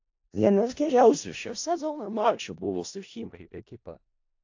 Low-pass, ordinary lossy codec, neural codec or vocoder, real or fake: 7.2 kHz; AAC, 48 kbps; codec, 16 kHz in and 24 kHz out, 0.4 kbps, LongCat-Audio-Codec, four codebook decoder; fake